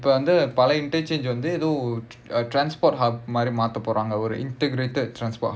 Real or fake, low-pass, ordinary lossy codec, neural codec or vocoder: real; none; none; none